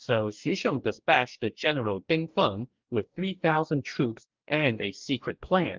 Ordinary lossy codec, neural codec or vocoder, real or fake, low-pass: Opus, 32 kbps; codec, 44.1 kHz, 2.6 kbps, DAC; fake; 7.2 kHz